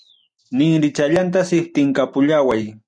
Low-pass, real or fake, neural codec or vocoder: 9.9 kHz; real; none